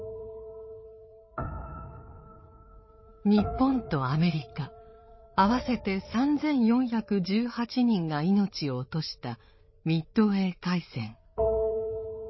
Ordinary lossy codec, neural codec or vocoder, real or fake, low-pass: MP3, 24 kbps; codec, 16 kHz, 8 kbps, FreqCodec, larger model; fake; 7.2 kHz